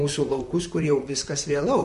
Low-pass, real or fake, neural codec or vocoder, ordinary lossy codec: 14.4 kHz; fake; vocoder, 44.1 kHz, 128 mel bands, Pupu-Vocoder; MP3, 48 kbps